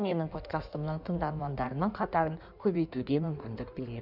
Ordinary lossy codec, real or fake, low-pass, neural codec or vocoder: none; fake; 5.4 kHz; codec, 16 kHz in and 24 kHz out, 1.1 kbps, FireRedTTS-2 codec